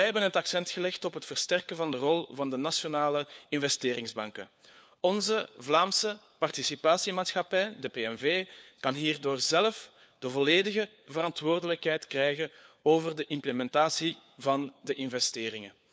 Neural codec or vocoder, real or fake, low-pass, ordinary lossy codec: codec, 16 kHz, 4 kbps, FunCodec, trained on LibriTTS, 50 frames a second; fake; none; none